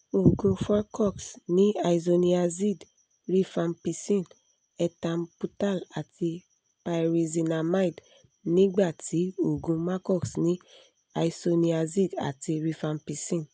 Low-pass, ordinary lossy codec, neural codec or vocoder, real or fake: none; none; none; real